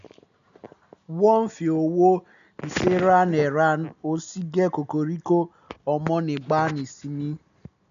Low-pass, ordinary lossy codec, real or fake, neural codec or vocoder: 7.2 kHz; none; real; none